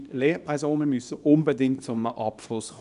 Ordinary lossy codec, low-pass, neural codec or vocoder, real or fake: none; 10.8 kHz; codec, 24 kHz, 0.9 kbps, WavTokenizer, small release; fake